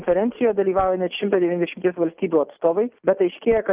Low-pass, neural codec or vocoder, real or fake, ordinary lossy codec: 3.6 kHz; none; real; Opus, 32 kbps